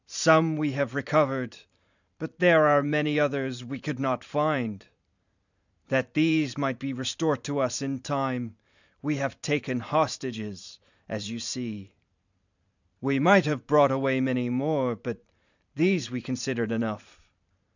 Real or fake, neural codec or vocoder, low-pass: fake; vocoder, 44.1 kHz, 128 mel bands every 512 samples, BigVGAN v2; 7.2 kHz